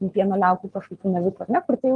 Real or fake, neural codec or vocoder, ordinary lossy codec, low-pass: real; none; Opus, 32 kbps; 10.8 kHz